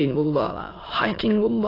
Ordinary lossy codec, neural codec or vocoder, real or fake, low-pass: AAC, 24 kbps; autoencoder, 22.05 kHz, a latent of 192 numbers a frame, VITS, trained on many speakers; fake; 5.4 kHz